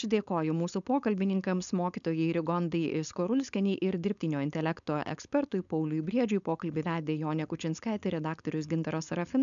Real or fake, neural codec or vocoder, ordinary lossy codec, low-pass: fake; codec, 16 kHz, 4.8 kbps, FACodec; MP3, 96 kbps; 7.2 kHz